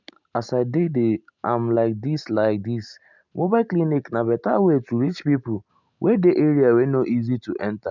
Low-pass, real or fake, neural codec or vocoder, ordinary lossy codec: 7.2 kHz; real; none; none